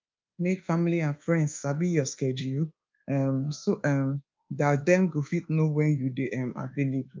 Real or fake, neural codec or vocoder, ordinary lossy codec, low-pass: fake; codec, 24 kHz, 1.2 kbps, DualCodec; Opus, 24 kbps; 7.2 kHz